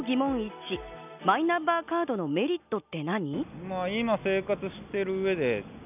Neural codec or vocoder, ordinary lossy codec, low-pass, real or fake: none; none; 3.6 kHz; real